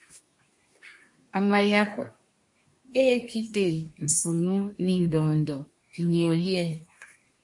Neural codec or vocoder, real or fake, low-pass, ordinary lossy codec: codec, 24 kHz, 1 kbps, SNAC; fake; 10.8 kHz; MP3, 48 kbps